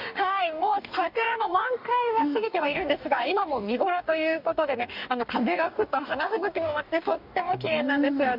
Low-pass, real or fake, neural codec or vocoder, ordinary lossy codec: 5.4 kHz; fake; codec, 44.1 kHz, 2.6 kbps, DAC; none